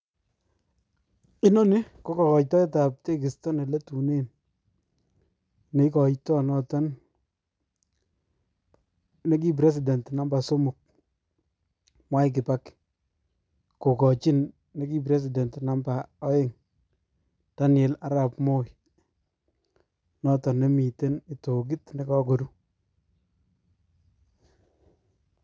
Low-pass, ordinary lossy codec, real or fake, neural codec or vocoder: none; none; real; none